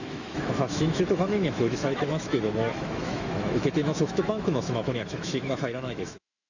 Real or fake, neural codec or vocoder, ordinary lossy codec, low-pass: fake; autoencoder, 48 kHz, 128 numbers a frame, DAC-VAE, trained on Japanese speech; none; 7.2 kHz